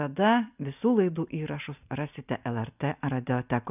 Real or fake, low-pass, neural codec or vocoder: real; 3.6 kHz; none